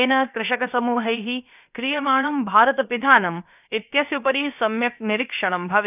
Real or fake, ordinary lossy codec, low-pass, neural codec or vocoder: fake; none; 3.6 kHz; codec, 16 kHz, about 1 kbps, DyCAST, with the encoder's durations